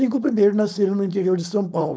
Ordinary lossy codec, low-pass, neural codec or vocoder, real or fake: none; none; codec, 16 kHz, 4.8 kbps, FACodec; fake